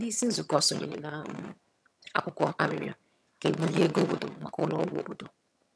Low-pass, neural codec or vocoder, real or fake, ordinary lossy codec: none; vocoder, 22.05 kHz, 80 mel bands, HiFi-GAN; fake; none